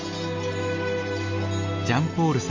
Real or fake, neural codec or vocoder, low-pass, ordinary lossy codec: real; none; 7.2 kHz; MP3, 32 kbps